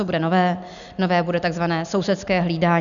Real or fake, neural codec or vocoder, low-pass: real; none; 7.2 kHz